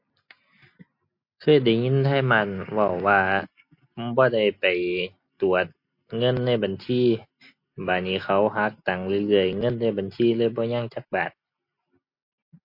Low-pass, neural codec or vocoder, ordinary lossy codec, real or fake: 5.4 kHz; none; MP3, 32 kbps; real